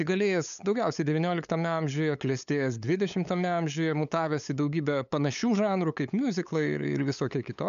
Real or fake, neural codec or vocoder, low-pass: fake; codec, 16 kHz, 8 kbps, FunCodec, trained on LibriTTS, 25 frames a second; 7.2 kHz